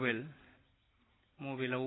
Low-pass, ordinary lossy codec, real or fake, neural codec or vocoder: 7.2 kHz; AAC, 16 kbps; real; none